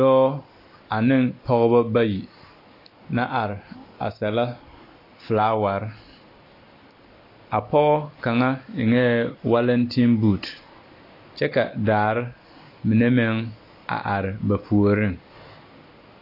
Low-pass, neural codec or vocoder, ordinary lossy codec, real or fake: 5.4 kHz; none; AAC, 32 kbps; real